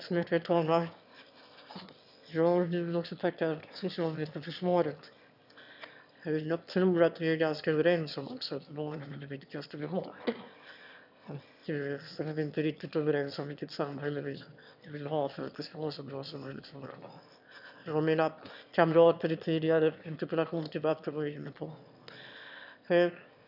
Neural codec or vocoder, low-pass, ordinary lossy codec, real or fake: autoencoder, 22.05 kHz, a latent of 192 numbers a frame, VITS, trained on one speaker; 5.4 kHz; none; fake